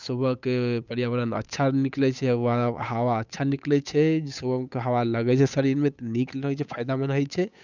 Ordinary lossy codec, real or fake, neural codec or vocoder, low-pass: none; fake; codec, 16 kHz, 8 kbps, FunCodec, trained on Chinese and English, 25 frames a second; 7.2 kHz